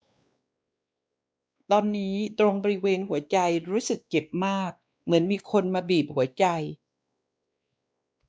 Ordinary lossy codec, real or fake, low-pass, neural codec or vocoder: none; fake; none; codec, 16 kHz, 2 kbps, X-Codec, WavLM features, trained on Multilingual LibriSpeech